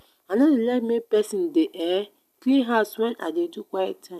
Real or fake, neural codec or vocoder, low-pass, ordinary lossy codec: real; none; 14.4 kHz; none